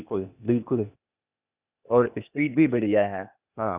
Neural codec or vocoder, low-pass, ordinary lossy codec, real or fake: codec, 16 kHz, 0.8 kbps, ZipCodec; 3.6 kHz; Opus, 64 kbps; fake